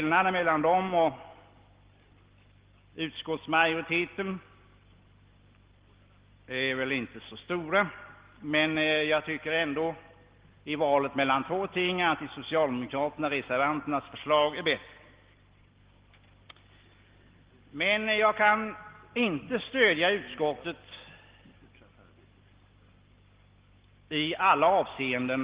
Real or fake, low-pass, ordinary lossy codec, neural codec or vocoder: real; 3.6 kHz; Opus, 16 kbps; none